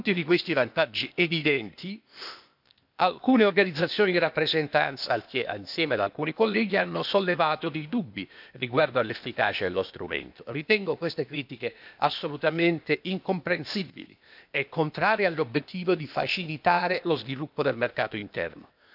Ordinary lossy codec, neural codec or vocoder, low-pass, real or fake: none; codec, 16 kHz, 0.8 kbps, ZipCodec; 5.4 kHz; fake